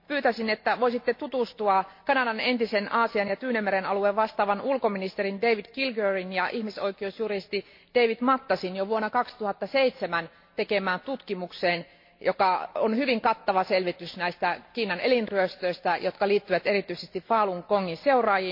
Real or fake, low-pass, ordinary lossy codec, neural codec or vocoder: real; 5.4 kHz; MP3, 32 kbps; none